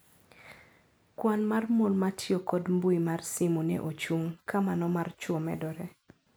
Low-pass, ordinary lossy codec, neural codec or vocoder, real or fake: none; none; none; real